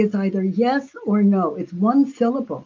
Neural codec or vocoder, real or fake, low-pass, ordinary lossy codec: none; real; 7.2 kHz; Opus, 32 kbps